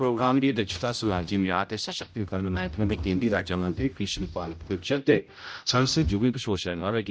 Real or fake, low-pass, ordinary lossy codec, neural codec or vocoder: fake; none; none; codec, 16 kHz, 0.5 kbps, X-Codec, HuBERT features, trained on general audio